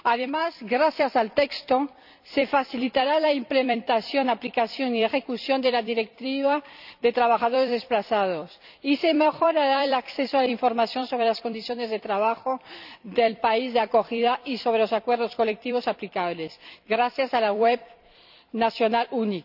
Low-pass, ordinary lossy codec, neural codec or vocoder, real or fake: 5.4 kHz; none; vocoder, 44.1 kHz, 128 mel bands every 256 samples, BigVGAN v2; fake